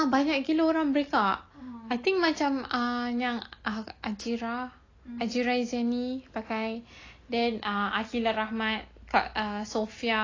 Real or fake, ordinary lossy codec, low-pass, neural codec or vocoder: real; AAC, 32 kbps; 7.2 kHz; none